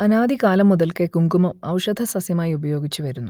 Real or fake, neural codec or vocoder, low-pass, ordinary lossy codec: real; none; 19.8 kHz; Opus, 32 kbps